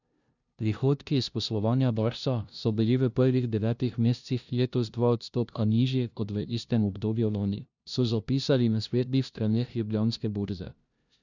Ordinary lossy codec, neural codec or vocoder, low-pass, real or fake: none; codec, 16 kHz, 0.5 kbps, FunCodec, trained on LibriTTS, 25 frames a second; 7.2 kHz; fake